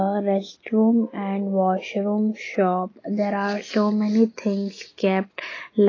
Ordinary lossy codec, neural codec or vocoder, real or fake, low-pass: AAC, 32 kbps; none; real; 7.2 kHz